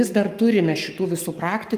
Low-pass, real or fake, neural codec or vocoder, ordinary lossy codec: 14.4 kHz; fake; codec, 44.1 kHz, 7.8 kbps, Pupu-Codec; Opus, 32 kbps